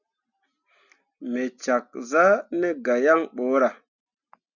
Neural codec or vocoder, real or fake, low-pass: none; real; 7.2 kHz